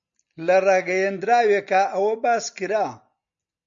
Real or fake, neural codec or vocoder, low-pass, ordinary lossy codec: real; none; 7.2 kHz; MP3, 64 kbps